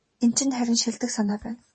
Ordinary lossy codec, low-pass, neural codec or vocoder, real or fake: MP3, 32 kbps; 10.8 kHz; vocoder, 44.1 kHz, 128 mel bands every 512 samples, BigVGAN v2; fake